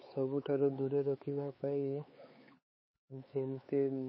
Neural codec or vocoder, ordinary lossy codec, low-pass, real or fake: codec, 16 kHz, 4 kbps, X-Codec, HuBERT features, trained on LibriSpeech; MP3, 24 kbps; 7.2 kHz; fake